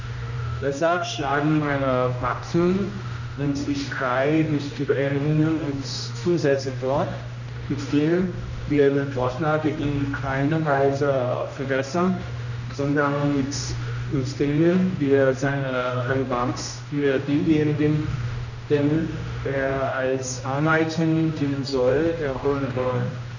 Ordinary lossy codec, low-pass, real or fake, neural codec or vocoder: none; 7.2 kHz; fake; codec, 16 kHz, 1 kbps, X-Codec, HuBERT features, trained on general audio